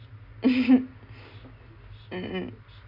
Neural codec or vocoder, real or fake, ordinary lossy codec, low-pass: none; real; none; 5.4 kHz